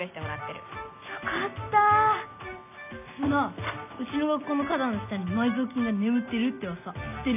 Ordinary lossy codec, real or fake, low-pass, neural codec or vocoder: none; real; 3.6 kHz; none